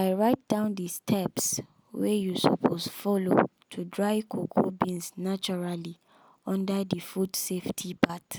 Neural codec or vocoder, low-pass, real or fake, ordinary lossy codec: none; none; real; none